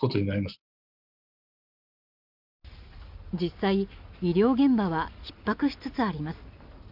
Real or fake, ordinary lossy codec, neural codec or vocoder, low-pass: real; none; none; 5.4 kHz